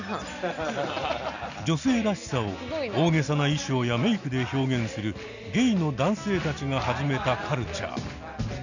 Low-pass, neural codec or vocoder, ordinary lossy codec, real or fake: 7.2 kHz; none; none; real